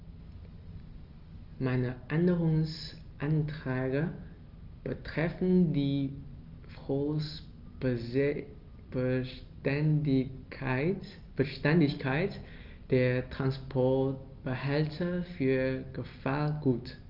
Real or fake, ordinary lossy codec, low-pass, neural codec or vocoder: real; Opus, 24 kbps; 5.4 kHz; none